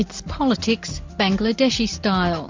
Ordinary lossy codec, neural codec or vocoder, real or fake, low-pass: MP3, 64 kbps; none; real; 7.2 kHz